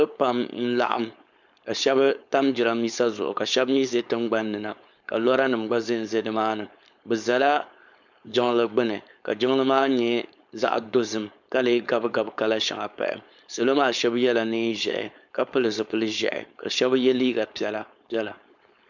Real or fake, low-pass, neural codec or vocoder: fake; 7.2 kHz; codec, 16 kHz, 4.8 kbps, FACodec